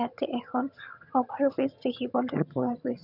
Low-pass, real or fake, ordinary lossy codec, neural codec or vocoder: 5.4 kHz; fake; none; vocoder, 22.05 kHz, 80 mel bands, HiFi-GAN